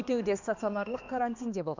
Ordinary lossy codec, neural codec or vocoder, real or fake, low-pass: none; codec, 16 kHz, 2 kbps, X-Codec, HuBERT features, trained on balanced general audio; fake; 7.2 kHz